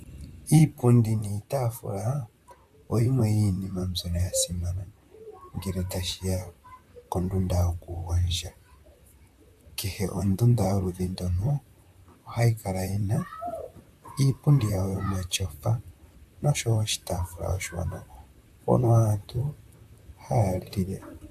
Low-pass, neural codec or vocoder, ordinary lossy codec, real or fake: 14.4 kHz; vocoder, 44.1 kHz, 128 mel bands, Pupu-Vocoder; AAC, 96 kbps; fake